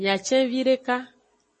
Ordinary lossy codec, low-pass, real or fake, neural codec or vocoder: MP3, 32 kbps; 10.8 kHz; real; none